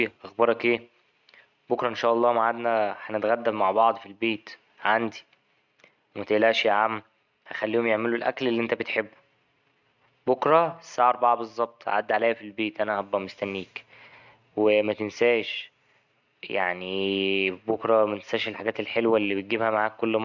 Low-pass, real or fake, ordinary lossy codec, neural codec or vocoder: 7.2 kHz; real; none; none